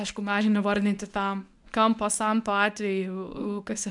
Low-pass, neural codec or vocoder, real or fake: 10.8 kHz; codec, 24 kHz, 0.9 kbps, WavTokenizer, medium speech release version 1; fake